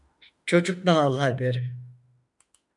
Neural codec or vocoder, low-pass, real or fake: autoencoder, 48 kHz, 32 numbers a frame, DAC-VAE, trained on Japanese speech; 10.8 kHz; fake